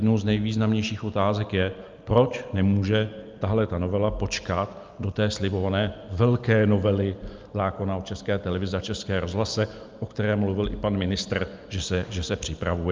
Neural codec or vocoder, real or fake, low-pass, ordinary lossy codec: none; real; 7.2 kHz; Opus, 24 kbps